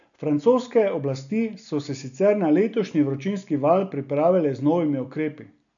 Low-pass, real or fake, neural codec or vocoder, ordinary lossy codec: 7.2 kHz; real; none; none